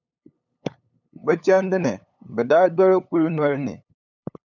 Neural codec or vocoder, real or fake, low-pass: codec, 16 kHz, 8 kbps, FunCodec, trained on LibriTTS, 25 frames a second; fake; 7.2 kHz